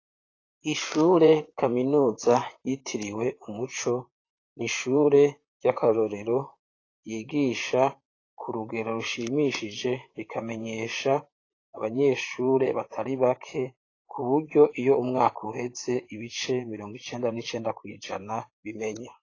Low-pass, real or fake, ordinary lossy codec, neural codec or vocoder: 7.2 kHz; fake; AAC, 32 kbps; vocoder, 44.1 kHz, 128 mel bands, Pupu-Vocoder